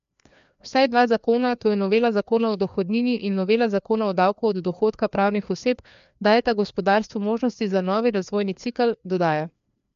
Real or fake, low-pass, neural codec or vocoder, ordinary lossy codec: fake; 7.2 kHz; codec, 16 kHz, 2 kbps, FreqCodec, larger model; MP3, 64 kbps